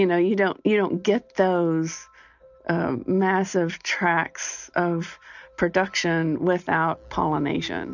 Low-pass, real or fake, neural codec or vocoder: 7.2 kHz; real; none